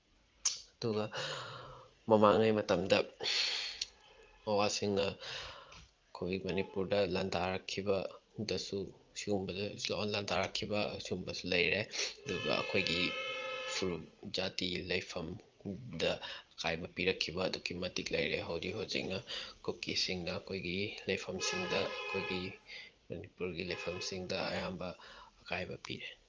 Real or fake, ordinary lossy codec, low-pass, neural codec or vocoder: fake; Opus, 32 kbps; 7.2 kHz; vocoder, 44.1 kHz, 80 mel bands, Vocos